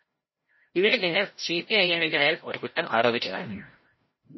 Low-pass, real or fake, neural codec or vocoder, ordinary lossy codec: 7.2 kHz; fake; codec, 16 kHz, 0.5 kbps, FreqCodec, larger model; MP3, 24 kbps